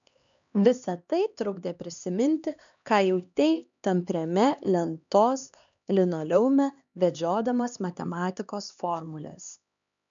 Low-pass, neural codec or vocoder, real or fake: 7.2 kHz; codec, 16 kHz, 2 kbps, X-Codec, HuBERT features, trained on LibriSpeech; fake